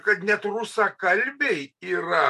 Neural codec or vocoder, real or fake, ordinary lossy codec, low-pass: none; real; MP3, 64 kbps; 14.4 kHz